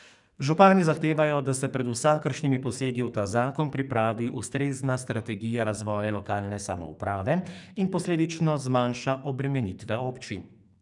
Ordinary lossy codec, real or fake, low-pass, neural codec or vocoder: none; fake; 10.8 kHz; codec, 44.1 kHz, 2.6 kbps, SNAC